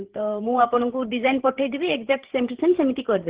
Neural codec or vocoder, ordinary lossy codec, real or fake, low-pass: codec, 16 kHz, 8 kbps, FreqCodec, larger model; Opus, 16 kbps; fake; 3.6 kHz